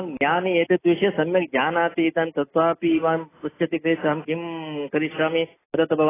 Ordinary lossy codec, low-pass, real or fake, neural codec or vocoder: AAC, 16 kbps; 3.6 kHz; real; none